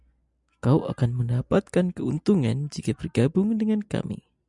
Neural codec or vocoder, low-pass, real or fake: none; 10.8 kHz; real